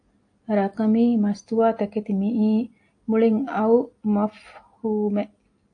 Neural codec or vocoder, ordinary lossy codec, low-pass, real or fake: none; AAC, 48 kbps; 9.9 kHz; real